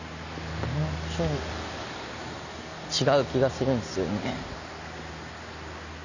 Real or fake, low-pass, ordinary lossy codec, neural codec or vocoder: real; 7.2 kHz; none; none